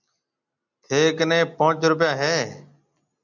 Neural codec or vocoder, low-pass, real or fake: none; 7.2 kHz; real